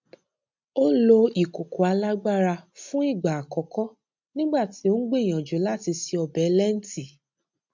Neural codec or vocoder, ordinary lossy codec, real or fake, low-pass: none; MP3, 64 kbps; real; 7.2 kHz